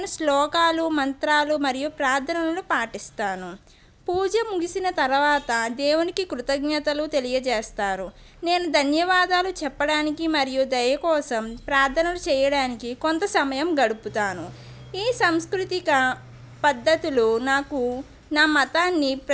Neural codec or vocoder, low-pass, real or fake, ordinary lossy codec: none; none; real; none